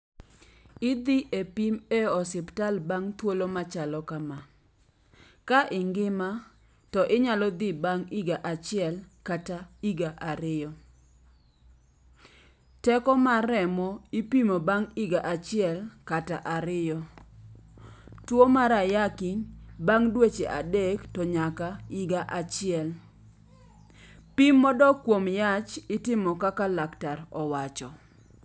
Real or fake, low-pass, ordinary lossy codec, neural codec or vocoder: real; none; none; none